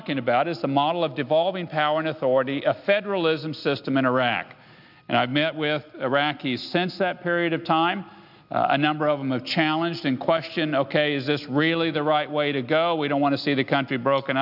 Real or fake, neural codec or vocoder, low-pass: real; none; 5.4 kHz